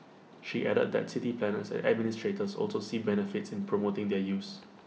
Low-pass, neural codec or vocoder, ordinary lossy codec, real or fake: none; none; none; real